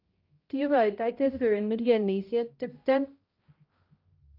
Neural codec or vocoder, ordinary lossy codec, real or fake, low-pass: codec, 16 kHz, 0.5 kbps, X-Codec, HuBERT features, trained on balanced general audio; Opus, 24 kbps; fake; 5.4 kHz